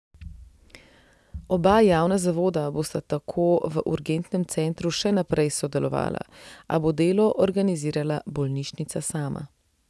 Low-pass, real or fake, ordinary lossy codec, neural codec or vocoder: none; real; none; none